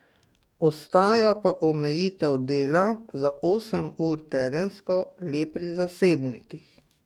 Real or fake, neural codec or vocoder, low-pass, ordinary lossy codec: fake; codec, 44.1 kHz, 2.6 kbps, DAC; 19.8 kHz; none